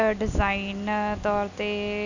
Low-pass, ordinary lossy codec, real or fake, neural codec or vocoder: 7.2 kHz; none; real; none